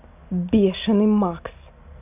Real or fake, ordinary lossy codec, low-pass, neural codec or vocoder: real; AAC, 32 kbps; 3.6 kHz; none